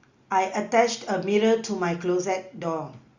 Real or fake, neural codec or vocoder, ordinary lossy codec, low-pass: real; none; Opus, 64 kbps; 7.2 kHz